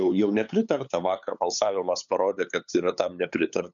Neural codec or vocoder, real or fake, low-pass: codec, 16 kHz, 8 kbps, FunCodec, trained on LibriTTS, 25 frames a second; fake; 7.2 kHz